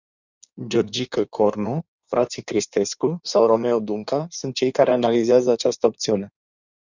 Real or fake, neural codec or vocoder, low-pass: fake; codec, 16 kHz in and 24 kHz out, 1.1 kbps, FireRedTTS-2 codec; 7.2 kHz